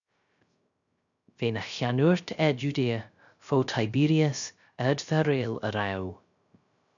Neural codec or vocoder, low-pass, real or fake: codec, 16 kHz, 0.3 kbps, FocalCodec; 7.2 kHz; fake